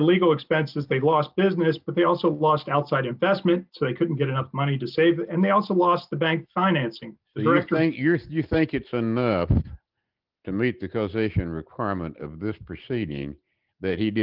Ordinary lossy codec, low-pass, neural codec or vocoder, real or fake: Opus, 24 kbps; 5.4 kHz; none; real